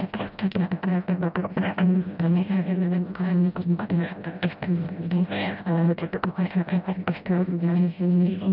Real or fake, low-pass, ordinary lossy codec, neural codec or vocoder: fake; 5.4 kHz; none; codec, 16 kHz, 0.5 kbps, FreqCodec, smaller model